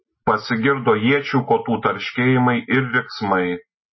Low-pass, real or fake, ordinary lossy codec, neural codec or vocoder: 7.2 kHz; real; MP3, 24 kbps; none